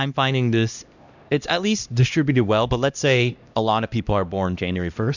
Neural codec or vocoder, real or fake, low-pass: codec, 16 kHz, 1 kbps, X-Codec, HuBERT features, trained on LibriSpeech; fake; 7.2 kHz